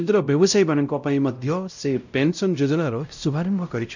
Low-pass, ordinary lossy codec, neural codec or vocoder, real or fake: 7.2 kHz; none; codec, 16 kHz, 0.5 kbps, X-Codec, WavLM features, trained on Multilingual LibriSpeech; fake